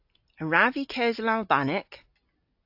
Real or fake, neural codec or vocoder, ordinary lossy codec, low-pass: real; none; AAC, 48 kbps; 5.4 kHz